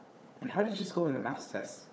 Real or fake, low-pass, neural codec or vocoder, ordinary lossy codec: fake; none; codec, 16 kHz, 4 kbps, FunCodec, trained on Chinese and English, 50 frames a second; none